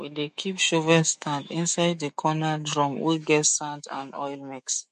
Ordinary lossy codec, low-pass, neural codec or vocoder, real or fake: MP3, 48 kbps; 14.4 kHz; autoencoder, 48 kHz, 128 numbers a frame, DAC-VAE, trained on Japanese speech; fake